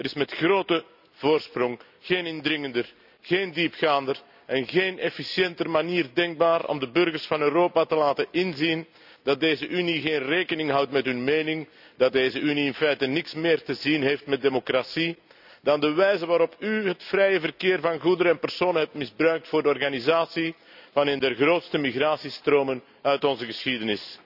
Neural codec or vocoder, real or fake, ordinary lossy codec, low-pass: none; real; none; 5.4 kHz